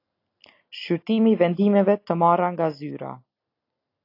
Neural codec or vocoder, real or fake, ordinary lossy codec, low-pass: none; real; AAC, 32 kbps; 5.4 kHz